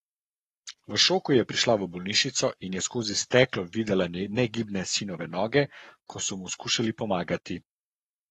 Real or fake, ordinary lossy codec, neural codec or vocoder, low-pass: fake; AAC, 32 kbps; codec, 44.1 kHz, 7.8 kbps, Pupu-Codec; 19.8 kHz